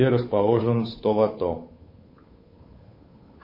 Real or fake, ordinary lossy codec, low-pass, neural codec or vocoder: fake; MP3, 24 kbps; 5.4 kHz; codec, 16 kHz, 8 kbps, FunCodec, trained on Chinese and English, 25 frames a second